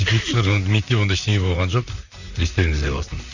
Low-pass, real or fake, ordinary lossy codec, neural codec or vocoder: 7.2 kHz; fake; none; vocoder, 44.1 kHz, 128 mel bands, Pupu-Vocoder